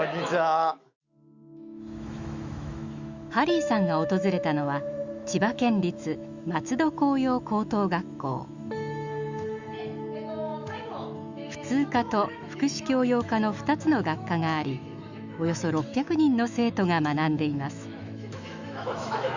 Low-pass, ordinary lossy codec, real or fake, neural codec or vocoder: 7.2 kHz; Opus, 64 kbps; fake; autoencoder, 48 kHz, 128 numbers a frame, DAC-VAE, trained on Japanese speech